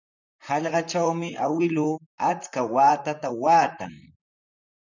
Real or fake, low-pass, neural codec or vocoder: fake; 7.2 kHz; vocoder, 44.1 kHz, 128 mel bands, Pupu-Vocoder